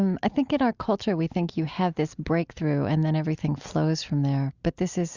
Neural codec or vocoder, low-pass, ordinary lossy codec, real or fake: none; 7.2 kHz; Opus, 64 kbps; real